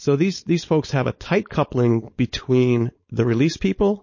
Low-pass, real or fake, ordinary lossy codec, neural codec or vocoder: 7.2 kHz; fake; MP3, 32 kbps; codec, 16 kHz, 4.8 kbps, FACodec